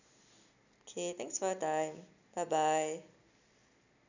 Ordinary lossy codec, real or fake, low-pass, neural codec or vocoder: none; real; 7.2 kHz; none